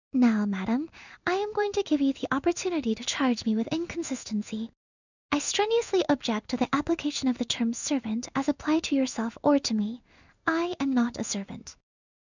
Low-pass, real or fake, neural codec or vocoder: 7.2 kHz; fake; codec, 16 kHz in and 24 kHz out, 1 kbps, XY-Tokenizer